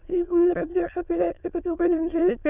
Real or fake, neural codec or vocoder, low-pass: fake; autoencoder, 22.05 kHz, a latent of 192 numbers a frame, VITS, trained on many speakers; 3.6 kHz